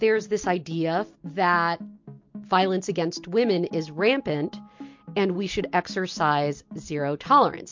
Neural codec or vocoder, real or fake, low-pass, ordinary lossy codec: none; real; 7.2 kHz; MP3, 48 kbps